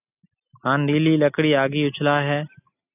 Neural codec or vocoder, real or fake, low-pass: none; real; 3.6 kHz